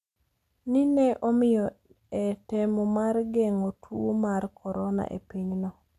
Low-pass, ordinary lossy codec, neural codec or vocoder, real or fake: 14.4 kHz; none; none; real